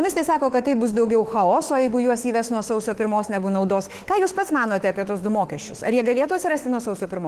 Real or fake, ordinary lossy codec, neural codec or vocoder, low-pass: fake; Opus, 16 kbps; autoencoder, 48 kHz, 32 numbers a frame, DAC-VAE, trained on Japanese speech; 14.4 kHz